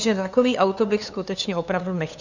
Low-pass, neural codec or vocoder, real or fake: 7.2 kHz; codec, 16 kHz, 2 kbps, FunCodec, trained on LibriTTS, 25 frames a second; fake